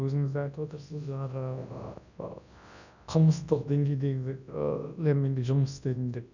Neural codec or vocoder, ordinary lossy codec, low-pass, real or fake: codec, 24 kHz, 0.9 kbps, WavTokenizer, large speech release; none; 7.2 kHz; fake